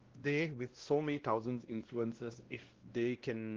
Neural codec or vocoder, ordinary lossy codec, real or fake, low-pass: codec, 16 kHz, 1 kbps, X-Codec, WavLM features, trained on Multilingual LibriSpeech; Opus, 16 kbps; fake; 7.2 kHz